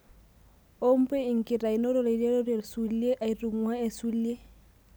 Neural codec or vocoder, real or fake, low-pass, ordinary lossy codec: none; real; none; none